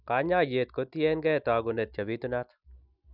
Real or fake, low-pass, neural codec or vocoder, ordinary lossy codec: real; 5.4 kHz; none; none